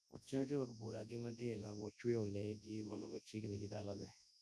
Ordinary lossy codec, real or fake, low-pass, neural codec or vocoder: none; fake; none; codec, 24 kHz, 0.9 kbps, WavTokenizer, large speech release